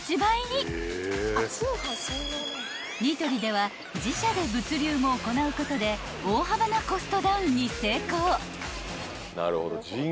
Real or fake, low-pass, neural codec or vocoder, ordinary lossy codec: real; none; none; none